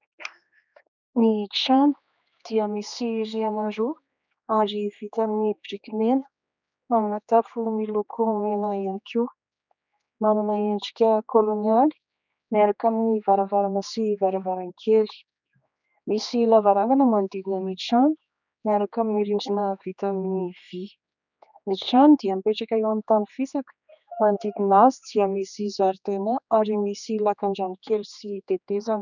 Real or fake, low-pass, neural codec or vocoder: fake; 7.2 kHz; codec, 16 kHz, 2 kbps, X-Codec, HuBERT features, trained on general audio